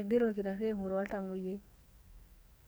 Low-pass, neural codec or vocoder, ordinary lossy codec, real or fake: none; codec, 44.1 kHz, 2.6 kbps, SNAC; none; fake